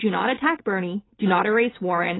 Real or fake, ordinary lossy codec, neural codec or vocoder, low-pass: real; AAC, 16 kbps; none; 7.2 kHz